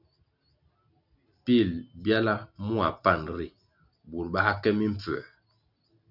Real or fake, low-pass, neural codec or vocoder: real; 5.4 kHz; none